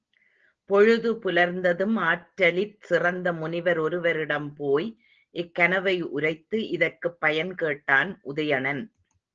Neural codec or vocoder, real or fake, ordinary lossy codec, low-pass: none; real; Opus, 16 kbps; 7.2 kHz